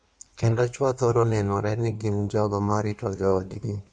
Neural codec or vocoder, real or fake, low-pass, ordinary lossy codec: codec, 16 kHz in and 24 kHz out, 1.1 kbps, FireRedTTS-2 codec; fake; 9.9 kHz; none